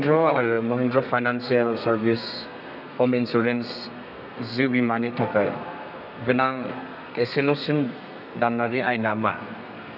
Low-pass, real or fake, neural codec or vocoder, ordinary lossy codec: 5.4 kHz; fake; codec, 32 kHz, 1.9 kbps, SNAC; none